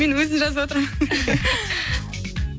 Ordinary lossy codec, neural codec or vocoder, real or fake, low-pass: none; none; real; none